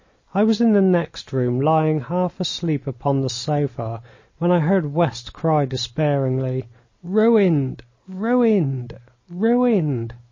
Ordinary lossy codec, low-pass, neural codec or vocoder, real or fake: MP3, 32 kbps; 7.2 kHz; none; real